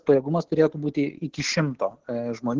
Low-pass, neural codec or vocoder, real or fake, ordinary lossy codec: 7.2 kHz; none; real; Opus, 16 kbps